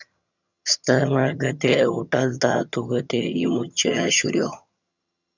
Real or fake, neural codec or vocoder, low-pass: fake; vocoder, 22.05 kHz, 80 mel bands, HiFi-GAN; 7.2 kHz